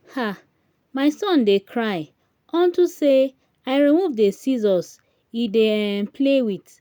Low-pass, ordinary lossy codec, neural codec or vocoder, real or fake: none; none; none; real